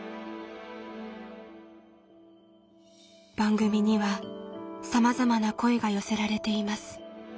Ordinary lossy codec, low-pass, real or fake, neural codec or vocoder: none; none; real; none